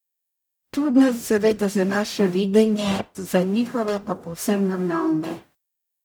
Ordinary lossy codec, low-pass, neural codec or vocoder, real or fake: none; none; codec, 44.1 kHz, 0.9 kbps, DAC; fake